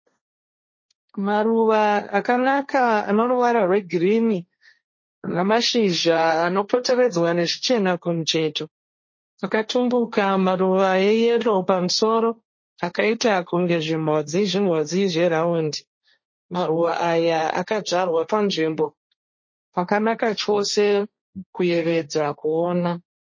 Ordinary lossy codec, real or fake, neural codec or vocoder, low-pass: MP3, 32 kbps; fake; codec, 16 kHz, 1.1 kbps, Voila-Tokenizer; 7.2 kHz